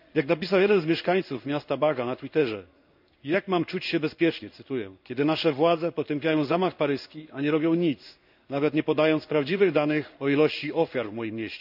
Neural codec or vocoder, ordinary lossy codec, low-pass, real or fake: codec, 16 kHz in and 24 kHz out, 1 kbps, XY-Tokenizer; none; 5.4 kHz; fake